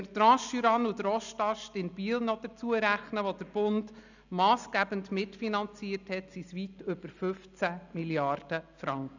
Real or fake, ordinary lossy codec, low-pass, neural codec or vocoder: real; none; 7.2 kHz; none